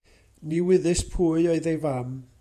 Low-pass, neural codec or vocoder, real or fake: 14.4 kHz; vocoder, 48 kHz, 128 mel bands, Vocos; fake